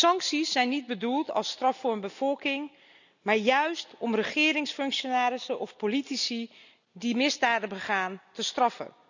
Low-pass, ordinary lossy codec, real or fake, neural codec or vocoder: 7.2 kHz; none; real; none